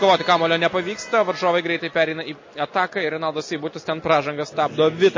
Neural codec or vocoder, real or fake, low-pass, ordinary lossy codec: none; real; 7.2 kHz; MP3, 32 kbps